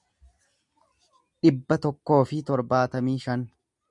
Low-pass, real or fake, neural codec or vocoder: 10.8 kHz; real; none